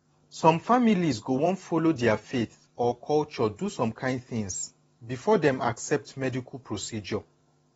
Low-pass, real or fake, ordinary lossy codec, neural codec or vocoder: 19.8 kHz; fake; AAC, 24 kbps; vocoder, 44.1 kHz, 128 mel bands every 512 samples, BigVGAN v2